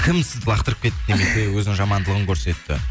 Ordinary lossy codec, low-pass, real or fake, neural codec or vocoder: none; none; real; none